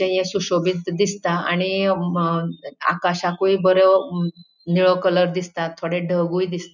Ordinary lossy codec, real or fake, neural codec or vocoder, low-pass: none; real; none; 7.2 kHz